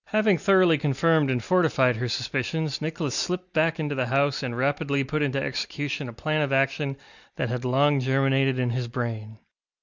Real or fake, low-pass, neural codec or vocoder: real; 7.2 kHz; none